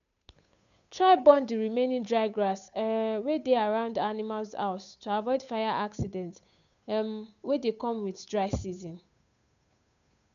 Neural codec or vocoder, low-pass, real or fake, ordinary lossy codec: codec, 16 kHz, 8 kbps, FunCodec, trained on Chinese and English, 25 frames a second; 7.2 kHz; fake; none